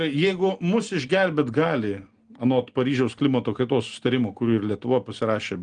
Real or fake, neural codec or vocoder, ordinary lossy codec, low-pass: real; none; Opus, 24 kbps; 9.9 kHz